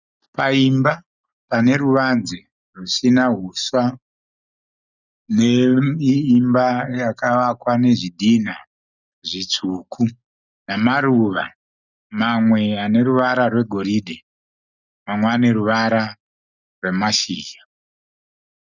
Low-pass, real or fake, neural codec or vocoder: 7.2 kHz; real; none